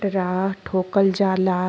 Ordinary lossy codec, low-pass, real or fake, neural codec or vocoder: none; none; real; none